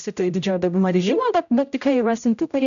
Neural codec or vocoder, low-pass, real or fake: codec, 16 kHz, 0.5 kbps, X-Codec, HuBERT features, trained on general audio; 7.2 kHz; fake